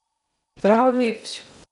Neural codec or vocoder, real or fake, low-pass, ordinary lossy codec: codec, 16 kHz in and 24 kHz out, 0.6 kbps, FocalCodec, streaming, 2048 codes; fake; 10.8 kHz; none